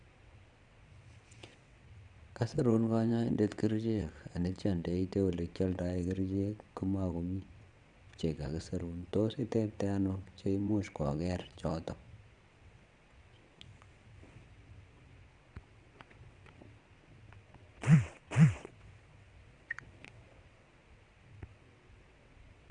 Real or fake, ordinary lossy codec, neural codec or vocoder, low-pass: fake; Opus, 64 kbps; vocoder, 22.05 kHz, 80 mel bands, WaveNeXt; 9.9 kHz